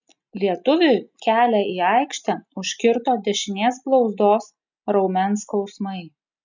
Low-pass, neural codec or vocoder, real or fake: 7.2 kHz; none; real